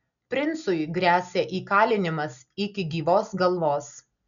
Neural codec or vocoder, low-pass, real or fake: none; 7.2 kHz; real